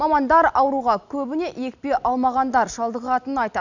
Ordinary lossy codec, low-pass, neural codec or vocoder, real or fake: none; 7.2 kHz; none; real